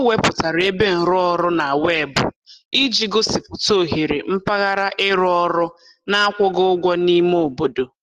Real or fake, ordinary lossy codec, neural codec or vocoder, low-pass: real; Opus, 16 kbps; none; 14.4 kHz